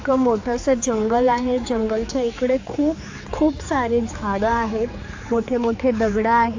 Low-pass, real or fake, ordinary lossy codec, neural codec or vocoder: 7.2 kHz; fake; AAC, 48 kbps; codec, 16 kHz, 4 kbps, X-Codec, HuBERT features, trained on general audio